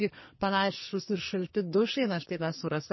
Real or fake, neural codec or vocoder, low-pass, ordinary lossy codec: fake; codec, 44.1 kHz, 2.6 kbps, SNAC; 7.2 kHz; MP3, 24 kbps